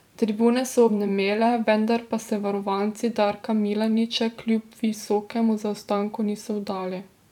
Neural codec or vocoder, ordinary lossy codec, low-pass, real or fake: vocoder, 44.1 kHz, 128 mel bands every 512 samples, BigVGAN v2; none; 19.8 kHz; fake